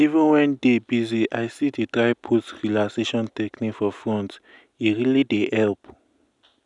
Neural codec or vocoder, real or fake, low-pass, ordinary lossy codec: none; real; 10.8 kHz; none